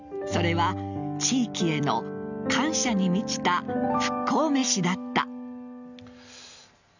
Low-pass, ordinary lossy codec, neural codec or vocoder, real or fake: 7.2 kHz; none; none; real